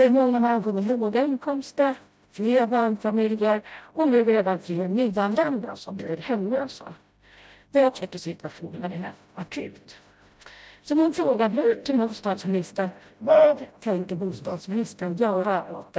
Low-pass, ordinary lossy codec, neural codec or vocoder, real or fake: none; none; codec, 16 kHz, 0.5 kbps, FreqCodec, smaller model; fake